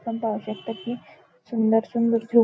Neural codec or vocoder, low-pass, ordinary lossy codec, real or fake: none; none; none; real